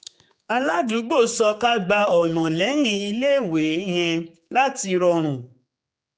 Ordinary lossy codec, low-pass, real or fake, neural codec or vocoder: none; none; fake; codec, 16 kHz, 4 kbps, X-Codec, HuBERT features, trained on general audio